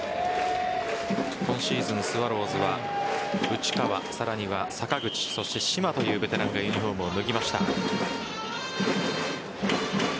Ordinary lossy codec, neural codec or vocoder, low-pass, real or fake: none; none; none; real